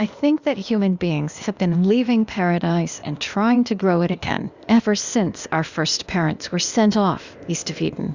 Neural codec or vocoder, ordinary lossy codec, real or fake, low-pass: codec, 16 kHz, 0.8 kbps, ZipCodec; Opus, 64 kbps; fake; 7.2 kHz